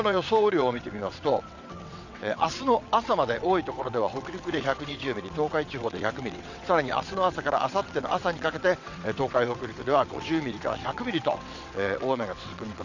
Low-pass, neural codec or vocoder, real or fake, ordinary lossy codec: 7.2 kHz; vocoder, 22.05 kHz, 80 mel bands, WaveNeXt; fake; none